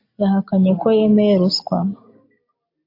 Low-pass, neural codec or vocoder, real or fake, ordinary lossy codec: 5.4 kHz; none; real; AAC, 32 kbps